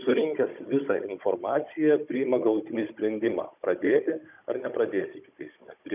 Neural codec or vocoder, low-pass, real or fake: codec, 16 kHz, 16 kbps, FunCodec, trained on Chinese and English, 50 frames a second; 3.6 kHz; fake